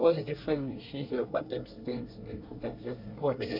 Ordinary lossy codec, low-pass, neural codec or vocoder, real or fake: none; 5.4 kHz; codec, 24 kHz, 1 kbps, SNAC; fake